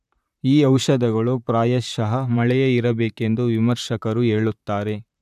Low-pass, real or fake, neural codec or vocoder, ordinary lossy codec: 14.4 kHz; fake; vocoder, 44.1 kHz, 128 mel bands, Pupu-Vocoder; none